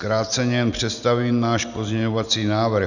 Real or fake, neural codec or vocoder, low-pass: real; none; 7.2 kHz